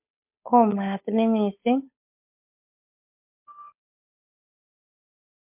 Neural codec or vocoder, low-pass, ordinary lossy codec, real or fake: codec, 16 kHz, 8 kbps, FunCodec, trained on Chinese and English, 25 frames a second; 3.6 kHz; MP3, 32 kbps; fake